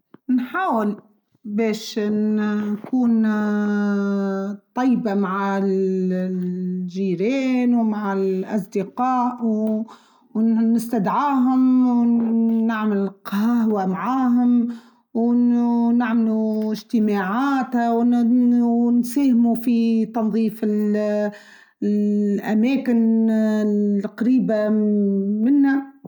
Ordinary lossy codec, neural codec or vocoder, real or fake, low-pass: none; none; real; 19.8 kHz